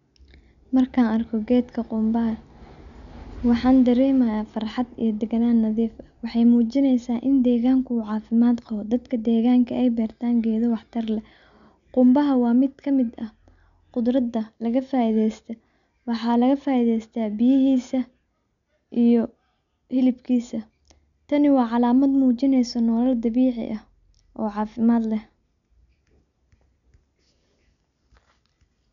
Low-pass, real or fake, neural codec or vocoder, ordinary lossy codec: 7.2 kHz; real; none; none